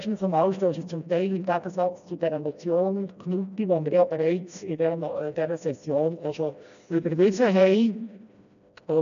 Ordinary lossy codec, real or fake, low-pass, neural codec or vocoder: none; fake; 7.2 kHz; codec, 16 kHz, 1 kbps, FreqCodec, smaller model